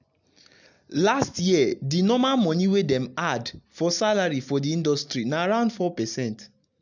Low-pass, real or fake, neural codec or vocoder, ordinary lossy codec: 7.2 kHz; real; none; none